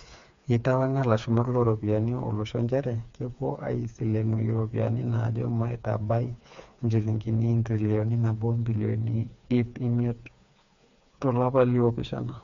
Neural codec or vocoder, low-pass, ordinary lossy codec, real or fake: codec, 16 kHz, 4 kbps, FreqCodec, smaller model; 7.2 kHz; MP3, 64 kbps; fake